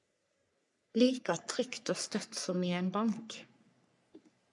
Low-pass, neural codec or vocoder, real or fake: 10.8 kHz; codec, 44.1 kHz, 3.4 kbps, Pupu-Codec; fake